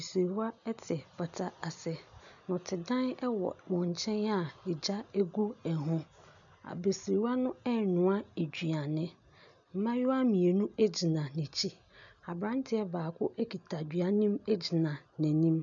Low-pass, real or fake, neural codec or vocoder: 7.2 kHz; real; none